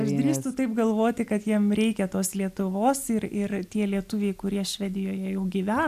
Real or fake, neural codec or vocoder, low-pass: real; none; 14.4 kHz